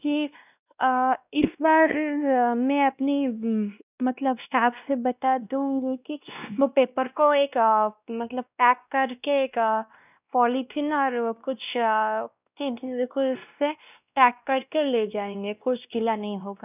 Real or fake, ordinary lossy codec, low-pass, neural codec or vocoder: fake; none; 3.6 kHz; codec, 16 kHz, 1 kbps, X-Codec, WavLM features, trained on Multilingual LibriSpeech